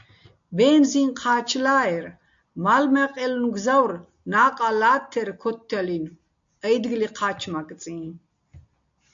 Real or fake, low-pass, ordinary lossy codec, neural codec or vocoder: real; 7.2 kHz; AAC, 64 kbps; none